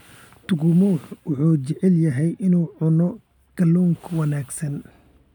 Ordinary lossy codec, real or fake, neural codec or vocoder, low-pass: none; real; none; 19.8 kHz